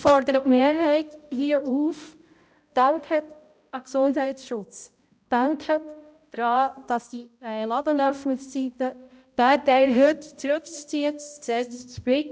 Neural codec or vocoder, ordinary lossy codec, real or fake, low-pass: codec, 16 kHz, 0.5 kbps, X-Codec, HuBERT features, trained on balanced general audio; none; fake; none